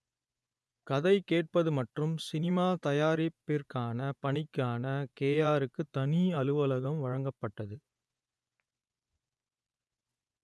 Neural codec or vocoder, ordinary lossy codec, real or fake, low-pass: vocoder, 24 kHz, 100 mel bands, Vocos; none; fake; none